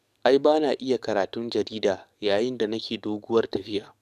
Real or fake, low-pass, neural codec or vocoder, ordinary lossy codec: fake; 14.4 kHz; codec, 44.1 kHz, 7.8 kbps, DAC; none